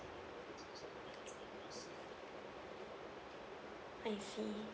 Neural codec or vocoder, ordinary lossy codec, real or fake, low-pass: none; none; real; none